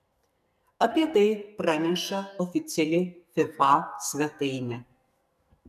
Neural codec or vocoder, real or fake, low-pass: codec, 44.1 kHz, 2.6 kbps, SNAC; fake; 14.4 kHz